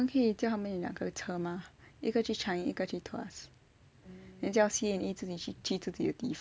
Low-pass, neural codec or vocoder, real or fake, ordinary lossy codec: none; none; real; none